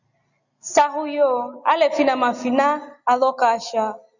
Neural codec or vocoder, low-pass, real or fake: none; 7.2 kHz; real